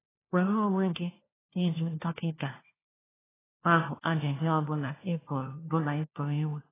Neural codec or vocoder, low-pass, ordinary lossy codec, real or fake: codec, 16 kHz, 1 kbps, FunCodec, trained on LibriTTS, 50 frames a second; 3.6 kHz; AAC, 16 kbps; fake